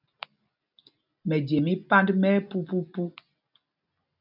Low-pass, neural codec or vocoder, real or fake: 5.4 kHz; none; real